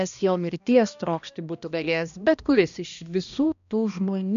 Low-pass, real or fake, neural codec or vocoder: 7.2 kHz; fake; codec, 16 kHz, 1 kbps, X-Codec, HuBERT features, trained on balanced general audio